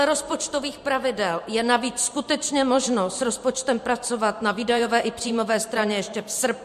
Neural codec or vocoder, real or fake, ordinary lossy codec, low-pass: vocoder, 44.1 kHz, 128 mel bands every 512 samples, BigVGAN v2; fake; MP3, 64 kbps; 14.4 kHz